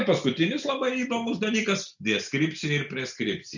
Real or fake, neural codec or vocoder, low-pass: real; none; 7.2 kHz